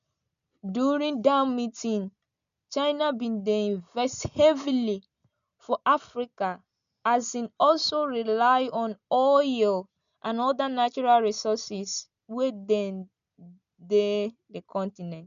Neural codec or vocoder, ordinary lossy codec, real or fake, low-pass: none; MP3, 96 kbps; real; 7.2 kHz